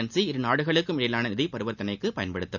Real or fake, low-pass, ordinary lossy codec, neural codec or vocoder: real; 7.2 kHz; none; none